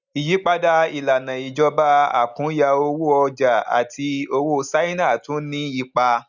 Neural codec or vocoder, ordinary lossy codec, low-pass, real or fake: none; none; 7.2 kHz; real